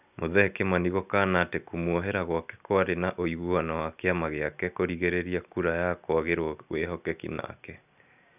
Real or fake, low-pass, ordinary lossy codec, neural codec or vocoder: real; 3.6 kHz; none; none